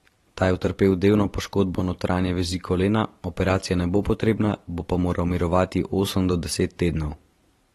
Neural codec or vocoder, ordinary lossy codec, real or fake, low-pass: vocoder, 44.1 kHz, 128 mel bands every 512 samples, BigVGAN v2; AAC, 32 kbps; fake; 19.8 kHz